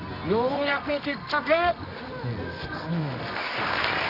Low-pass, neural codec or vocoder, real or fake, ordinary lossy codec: 5.4 kHz; codec, 24 kHz, 0.9 kbps, WavTokenizer, medium music audio release; fake; none